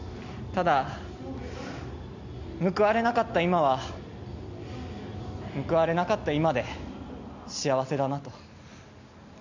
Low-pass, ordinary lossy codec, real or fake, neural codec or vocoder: 7.2 kHz; Opus, 64 kbps; real; none